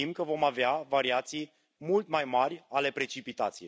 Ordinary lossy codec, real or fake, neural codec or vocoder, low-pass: none; real; none; none